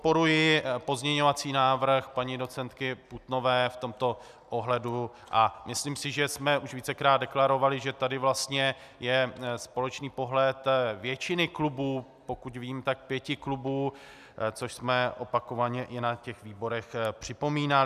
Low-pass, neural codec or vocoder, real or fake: 14.4 kHz; none; real